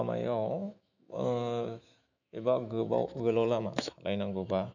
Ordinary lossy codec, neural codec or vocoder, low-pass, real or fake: none; autoencoder, 48 kHz, 128 numbers a frame, DAC-VAE, trained on Japanese speech; 7.2 kHz; fake